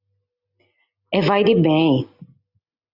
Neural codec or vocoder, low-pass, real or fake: none; 5.4 kHz; real